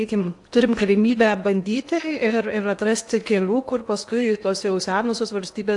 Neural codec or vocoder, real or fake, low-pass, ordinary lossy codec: codec, 16 kHz in and 24 kHz out, 0.8 kbps, FocalCodec, streaming, 65536 codes; fake; 10.8 kHz; AAC, 64 kbps